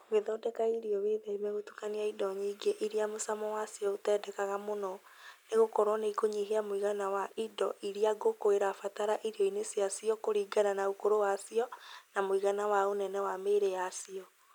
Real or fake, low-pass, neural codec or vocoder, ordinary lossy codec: real; none; none; none